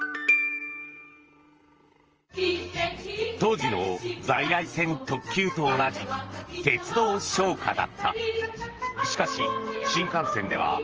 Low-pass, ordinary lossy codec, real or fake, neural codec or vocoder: 7.2 kHz; Opus, 24 kbps; fake; vocoder, 44.1 kHz, 128 mel bands, Pupu-Vocoder